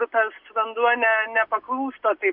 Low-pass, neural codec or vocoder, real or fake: 5.4 kHz; none; real